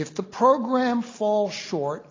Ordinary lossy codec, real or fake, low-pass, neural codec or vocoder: AAC, 32 kbps; real; 7.2 kHz; none